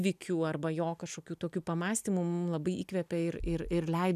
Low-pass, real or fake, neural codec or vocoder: 14.4 kHz; real; none